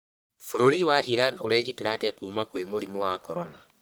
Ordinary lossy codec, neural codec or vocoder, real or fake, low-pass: none; codec, 44.1 kHz, 1.7 kbps, Pupu-Codec; fake; none